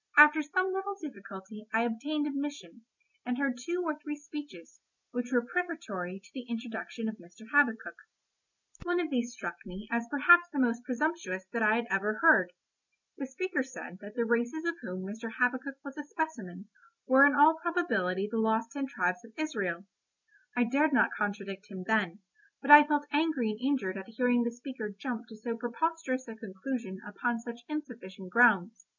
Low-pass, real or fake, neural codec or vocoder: 7.2 kHz; real; none